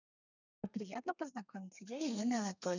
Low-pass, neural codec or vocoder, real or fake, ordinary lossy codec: 7.2 kHz; codec, 32 kHz, 1.9 kbps, SNAC; fake; none